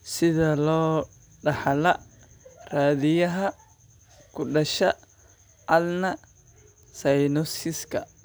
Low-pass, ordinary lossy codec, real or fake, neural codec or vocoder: none; none; real; none